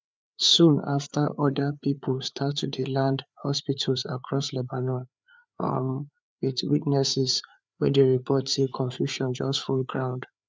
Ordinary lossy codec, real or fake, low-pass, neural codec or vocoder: none; fake; none; codec, 16 kHz, 4 kbps, FreqCodec, larger model